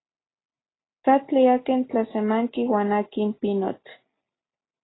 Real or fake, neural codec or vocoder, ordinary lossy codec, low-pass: real; none; AAC, 16 kbps; 7.2 kHz